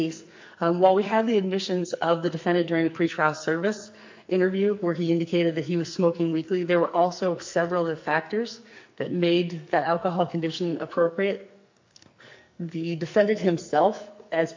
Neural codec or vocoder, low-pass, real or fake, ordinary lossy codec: codec, 44.1 kHz, 2.6 kbps, SNAC; 7.2 kHz; fake; MP3, 48 kbps